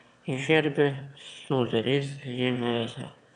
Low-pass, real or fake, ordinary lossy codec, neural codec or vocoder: 9.9 kHz; fake; none; autoencoder, 22.05 kHz, a latent of 192 numbers a frame, VITS, trained on one speaker